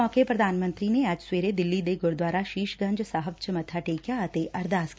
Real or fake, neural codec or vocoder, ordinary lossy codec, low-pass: real; none; none; none